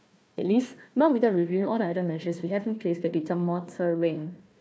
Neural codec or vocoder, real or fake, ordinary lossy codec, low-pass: codec, 16 kHz, 1 kbps, FunCodec, trained on Chinese and English, 50 frames a second; fake; none; none